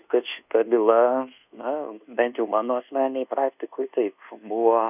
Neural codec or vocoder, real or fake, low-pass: codec, 24 kHz, 1.2 kbps, DualCodec; fake; 3.6 kHz